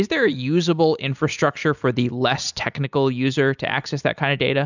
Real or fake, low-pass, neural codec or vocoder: real; 7.2 kHz; none